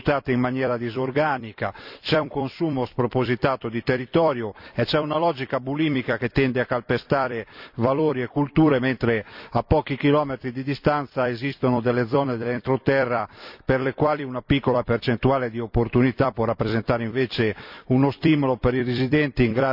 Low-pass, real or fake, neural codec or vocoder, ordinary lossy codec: 5.4 kHz; fake; vocoder, 44.1 kHz, 128 mel bands every 256 samples, BigVGAN v2; none